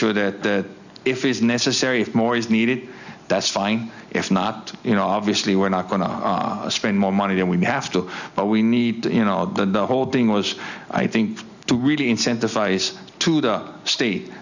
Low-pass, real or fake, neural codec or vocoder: 7.2 kHz; real; none